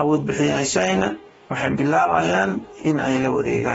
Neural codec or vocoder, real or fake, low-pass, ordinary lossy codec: codec, 44.1 kHz, 2.6 kbps, DAC; fake; 19.8 kHz; AAC, 24 kbps